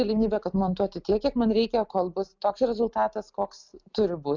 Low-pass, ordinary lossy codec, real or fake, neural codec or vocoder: 7.2 kHz; Opus, 64 kbps; real; none